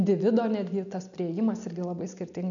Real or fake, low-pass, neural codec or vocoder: real; 7.2 kHz; none